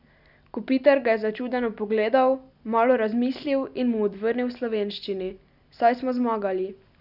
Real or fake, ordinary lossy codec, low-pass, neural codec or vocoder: real; none; 5.4 kHz; none